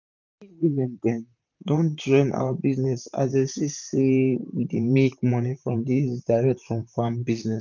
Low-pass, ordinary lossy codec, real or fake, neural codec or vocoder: 7.2 kHz; none; fake; vocoder, 44.1 kHz, 128 mel bands, Pupu-Vocoder